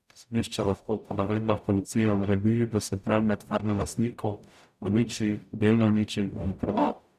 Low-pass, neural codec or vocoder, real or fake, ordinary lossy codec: 14.4 kHz; codec, 44.1 kHz, 0.9 kbps, DAC; fake; none